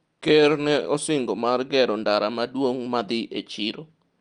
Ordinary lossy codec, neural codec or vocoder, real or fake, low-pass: Opus, 32 kbps; none; real; 9.9 kHz